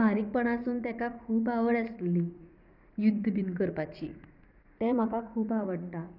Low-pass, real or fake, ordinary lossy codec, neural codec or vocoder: 5.4 kHz; fake; none; vocoder, 44.1 kHz, 80 mel bands, Vocos